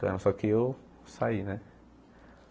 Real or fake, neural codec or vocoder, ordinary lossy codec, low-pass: real; none; none; none